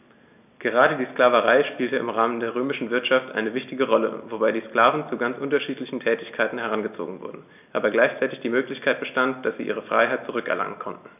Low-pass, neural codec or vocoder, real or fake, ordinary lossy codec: 3.6 kHz; none; real; none